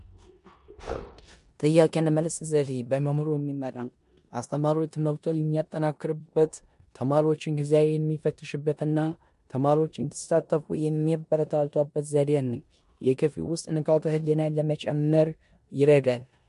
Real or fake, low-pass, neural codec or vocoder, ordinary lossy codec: fake; 10.8 kHz; codec, 16 kHz in and 24 kHz out, 0.9 kbps, LongCat-Audio-Codec, four codebook decoder; MP3, 64 kbps